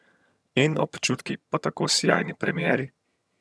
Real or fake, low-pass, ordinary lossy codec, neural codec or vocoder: fake; none; none; vocoder, 22.05 kHz, 80 mel bands, HiFi-GAN